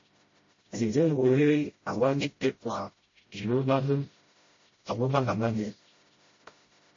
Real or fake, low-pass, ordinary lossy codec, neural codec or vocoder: fake; 7.2 kHz; MP3, 32 kbps; codec, 16 kHz, 0.5 kbps, FreqCodec, smaller model